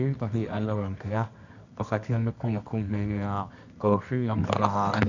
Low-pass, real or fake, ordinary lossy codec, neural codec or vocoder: 7.2 kHz; fake; none; codec, 24 kHz, 0.9 kbps, WavTokenizer, medium music audio release